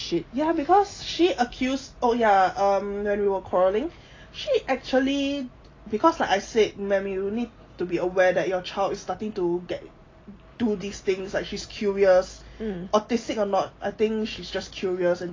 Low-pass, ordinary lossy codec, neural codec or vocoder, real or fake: 7.2 kHz; AAC, 32 kbps; none; real